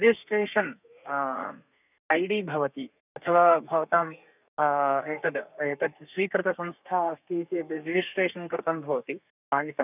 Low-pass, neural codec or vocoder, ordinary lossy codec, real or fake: 3.6 kHz; codec, 32 kHz, 1.9 kbps, SNAC; none; fake